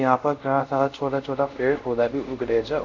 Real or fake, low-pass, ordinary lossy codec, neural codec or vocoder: fake; 7.2 kHz; none; codec, 24 kHz, 0.5 kbps, DualCodec